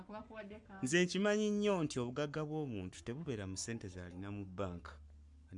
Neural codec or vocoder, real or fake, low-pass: codec, 44.1 kHz, 7.8 kbps, Pupu-Codec; fake; 10.8 kHz